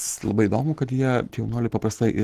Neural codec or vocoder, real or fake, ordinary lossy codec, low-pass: none; real; Opus, 16 kbps; 14.4 kHz